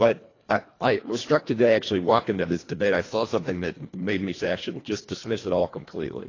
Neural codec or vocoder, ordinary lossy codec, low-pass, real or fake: codec, 24 kHz, 1.5 kbps, HILCodec; AAC, 32 kbps; 7.2 kHz; fake